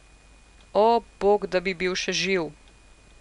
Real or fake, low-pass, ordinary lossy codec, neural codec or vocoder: real; 10.8 kHz; none; none